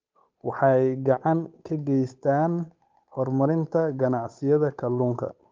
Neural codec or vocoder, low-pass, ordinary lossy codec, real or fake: codec, 16 kHz, 8 kbps, FunCodec, trained on Chinese and English, 25 frames a second; 7.2 kHz; Opus, 24 kbps; fake